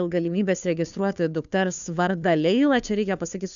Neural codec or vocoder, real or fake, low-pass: codec, 16 kHz, 2 kbps, FunCodec, trained on Chinese and English, 25 frames a second; fake; 7.2 kHz